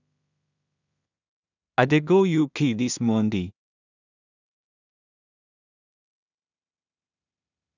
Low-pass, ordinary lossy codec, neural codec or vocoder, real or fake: 7.2 kHz; none; codec, 16 kHz in and 24 kHz out, 0.4 kbps, LongCat-Audio-Codec, two codebook decoder; fake